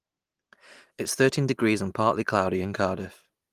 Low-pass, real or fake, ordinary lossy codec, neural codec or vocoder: 14.4 kHz; real; Opus, 24 kbps; none